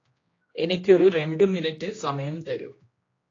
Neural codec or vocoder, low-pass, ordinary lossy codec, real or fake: codec, 16 kHz, 1 kbps, X-Codec, HuBERT features, trained on general audio; 7.2 kHz; AAC, 32 kbps; fake